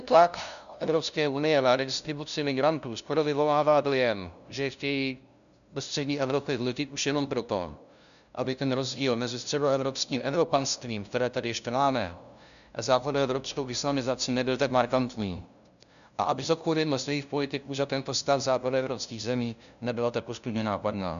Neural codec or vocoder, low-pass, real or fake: codec, 16 kHz, 0.5 kbps, FunCodec, trained on LibriTTS, 25 frames a second; 7.2 kHz; fake